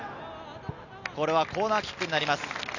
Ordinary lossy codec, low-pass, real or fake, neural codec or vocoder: none; 7.2 kHz; real; none